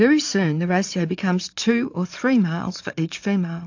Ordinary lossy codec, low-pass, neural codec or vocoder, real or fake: AAC, 48 kbps; 7.2 kHz; vocoder, 44.1 kHz, 80 mel bands, Vocos; fake